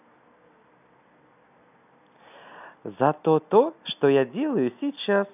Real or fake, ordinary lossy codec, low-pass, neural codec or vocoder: real; none; 3.6 kHz; none